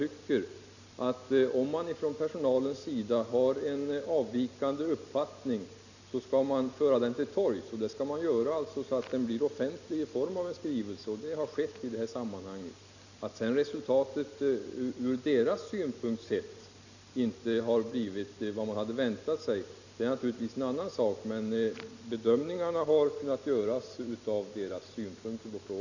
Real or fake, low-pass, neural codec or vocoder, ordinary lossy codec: real; 7.2 kHz; none; none